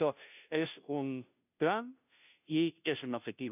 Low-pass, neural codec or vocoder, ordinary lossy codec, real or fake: 3.6 kHz; codec, 16 kHz, 0.5 kbps, FunCodec, trained on Chinese and English, 25 frames a second; none; fake